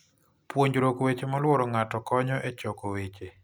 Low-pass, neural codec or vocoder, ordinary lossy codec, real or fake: none; none; none; real